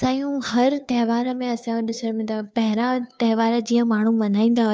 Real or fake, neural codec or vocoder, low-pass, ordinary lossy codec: fake; codec, 16 kHz, 2 kbps, FunCodec, trained on Chinese and English, 25 frames a second; none; none